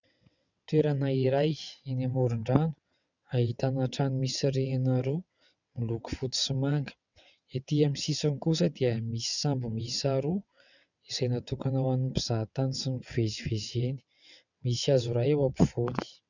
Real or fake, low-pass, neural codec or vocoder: fake; 7.2 kHz; vocoder, 22.05 kHz, 80 mel bands, WaveNeXt